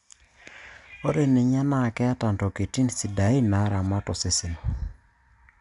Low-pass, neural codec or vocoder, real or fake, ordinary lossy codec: 10.8 kHz; none; real; none